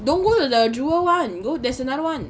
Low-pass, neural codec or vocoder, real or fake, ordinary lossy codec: none; none; real; none